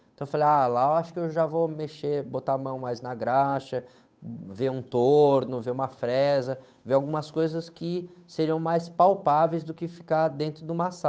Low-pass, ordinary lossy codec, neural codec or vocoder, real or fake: none; none; codec, 16 kHz, 8 kbps, FunCodec, trained on Chinese and English, 25 frames a second; fake